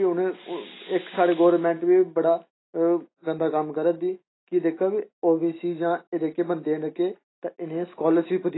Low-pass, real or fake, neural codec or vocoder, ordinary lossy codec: 7.2 kHz; real; none; AAC, 16 kbps